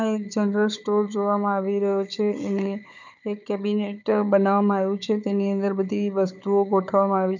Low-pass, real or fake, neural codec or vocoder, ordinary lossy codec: 7.2 kHz; fake; codec, 16 kHz, 4 kbps, FunCodec, trained on Chinese and English, 50 frames a second; none